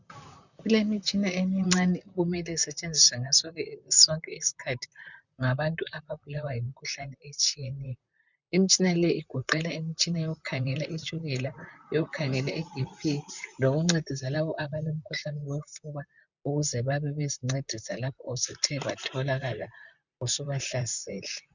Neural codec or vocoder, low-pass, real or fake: vocoder, 44.1 kHz, 128 mel bands, Pupu-Vocoder; 7.2 kHz; fake